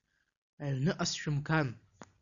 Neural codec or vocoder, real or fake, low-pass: none; real; 7.2 kHz